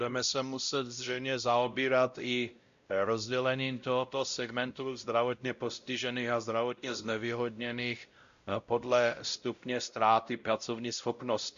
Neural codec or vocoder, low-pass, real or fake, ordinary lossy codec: codec, 16 kHz, 0.5 kbps, X-Codec, WavLM features, trained on Multilingual LibriSpeech; 7.2 kHz; fake; Opus, 64 kbps